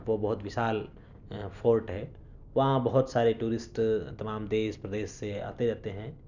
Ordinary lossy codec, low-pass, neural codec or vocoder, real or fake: none; 7.2 kHz; none; real